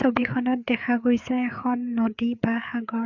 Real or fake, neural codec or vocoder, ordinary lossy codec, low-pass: fake; codec, 16 kHz, 4 kbps, FreqCodec, larger model; none; 7.2 kHz